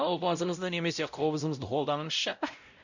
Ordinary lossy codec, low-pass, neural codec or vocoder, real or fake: none; 7.2 kHz; codec, 16 kHz, 0.5 kbps, X-Codec, WavLM features, trained on Multilingual LibriSpeech; fake